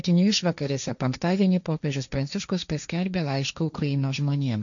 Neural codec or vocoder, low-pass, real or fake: codec, 16 kHz, 1.1 kbps, Voila-Tokenizer; 7.2 kHz; fake